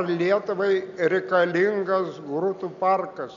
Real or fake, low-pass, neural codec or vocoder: real; 7.2 kHz; none